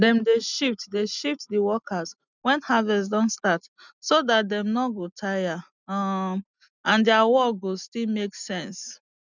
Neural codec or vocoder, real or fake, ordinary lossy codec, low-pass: none; real; none; 7.2 kHz